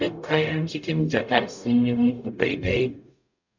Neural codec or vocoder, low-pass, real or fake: codec, 44.1 kHz, 0.9 kbps, DAC; 7.2 kHz; fake